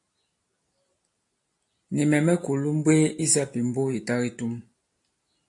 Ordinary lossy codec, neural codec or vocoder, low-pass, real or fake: AAC, 48 kbps; none; 10.8 kHz; real